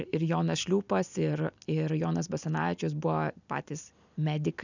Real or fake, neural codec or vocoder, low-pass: real; none; 7.2 kHz